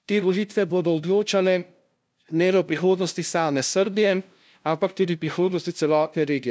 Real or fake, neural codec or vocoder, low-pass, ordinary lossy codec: fake; codec, 16 kHz, 0.5 kbps, FunCodec, trained on LibriTTS, 25 frames a second; none; none